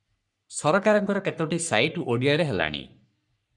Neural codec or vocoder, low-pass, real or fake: codec, 44.1 kHz, 3.4 kbps, Pupu-Codec; 10.8 kHz; fake